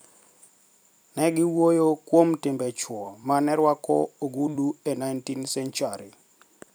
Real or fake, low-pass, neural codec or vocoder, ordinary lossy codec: fake; none; vocoder, 44.1 kHz, 128 mel bands every 256 samples, BigVGAN v2; none